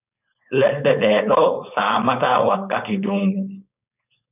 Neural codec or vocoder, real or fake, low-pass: codec, 16 kHz, 4.8 kbps, FACodec; fake; 3.6 kHz